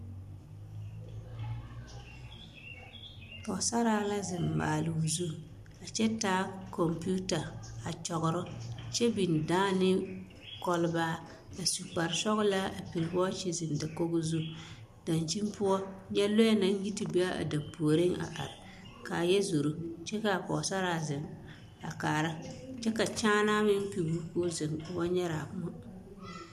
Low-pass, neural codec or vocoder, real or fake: 14.4 kHz; none; real